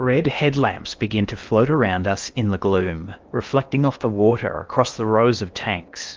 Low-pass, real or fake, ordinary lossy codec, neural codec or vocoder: 7.2 kHz; fake; Opus, 32 kbps; codec, 16 kHz in and 24 kHz out, 0.8 kbps, FocalCodec, streaming, 65536 codes